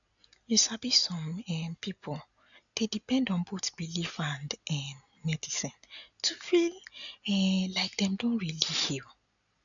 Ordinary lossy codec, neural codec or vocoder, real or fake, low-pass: none; none; real; 7.2 kHz